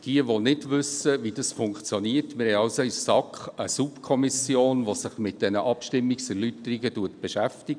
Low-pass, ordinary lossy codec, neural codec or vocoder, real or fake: 9.9 kHz; none; none; real